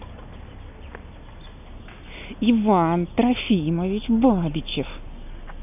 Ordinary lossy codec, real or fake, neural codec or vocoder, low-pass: none; real; none; 3.6 kHz